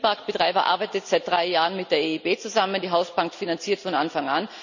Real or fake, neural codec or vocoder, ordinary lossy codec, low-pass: real; none; none; 7.2 kHz